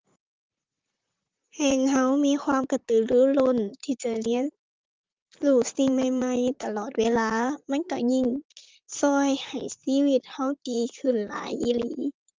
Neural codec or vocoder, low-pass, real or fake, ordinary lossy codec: vocoder, 44.1 kHz, 128 mel bands, Pupu-Vocoder; 7.2 kHz; fake; Opus, 32 kbps